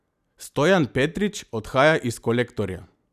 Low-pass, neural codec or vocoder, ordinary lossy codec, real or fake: 14.4 kHz; none; none; real